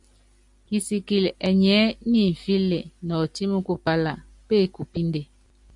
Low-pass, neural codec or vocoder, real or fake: 10.8 kHz; none; real